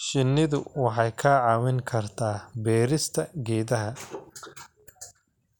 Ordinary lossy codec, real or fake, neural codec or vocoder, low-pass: none; real; none; 19.8 kHz